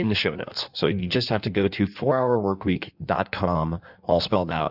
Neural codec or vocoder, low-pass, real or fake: codec, 16 kHz in and 24 kHz out, 1.1 kbps, FireRedTTS-2 codec; 5.4 kHz; fake